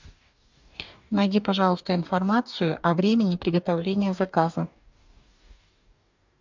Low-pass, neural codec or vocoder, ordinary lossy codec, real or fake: 7.2 kHz; codec, 44.1 kHz, 2.6 kbps, DAC; MP3, 64 kbps; fake